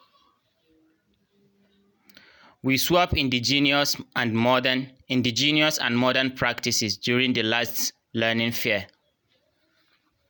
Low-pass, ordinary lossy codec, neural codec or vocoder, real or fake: none; none; none; real